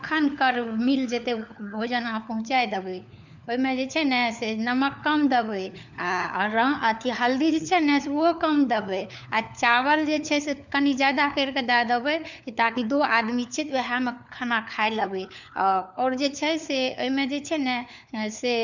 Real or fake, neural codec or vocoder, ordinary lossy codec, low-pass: fake; codec, 16 kHz, 4 kbps, FunCodec, trained on LibriTTS, 50 frames a second; none; 7.2 kHz